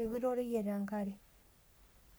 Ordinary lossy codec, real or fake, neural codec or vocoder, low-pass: none; fake; codec, 44.1 kHz, 3.4 kbps, Pupu-Codec; none